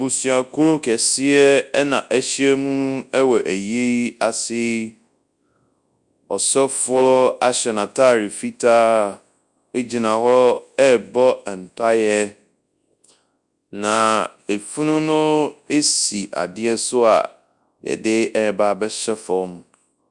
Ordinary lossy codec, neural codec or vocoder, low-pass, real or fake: Opus, 64 kbps; codec, 24 kHz, 0.9 kbps, WavTokenizer, large speech release; 10.8 kHz; fake